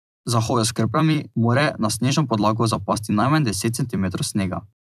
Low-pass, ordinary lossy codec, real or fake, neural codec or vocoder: 14.4 kHz; none; fake; vocoder, 44.1 kHz, 128 mel bands every 256 samples, BigVGAN v2